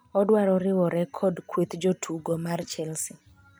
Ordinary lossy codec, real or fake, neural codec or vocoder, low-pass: none; real; none; none